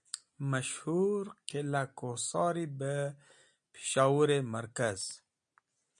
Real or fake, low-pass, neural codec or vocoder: real; 9.9 kHz; none